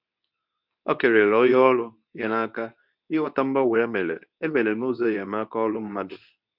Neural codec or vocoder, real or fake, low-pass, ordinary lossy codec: codec, 24 kHz, 0.9 kbps, WavTokenizer, medium speech release version 2; fake; 5.4 kHz; none